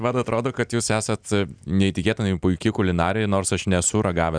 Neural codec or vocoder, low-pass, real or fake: none; 9.9 kHz; real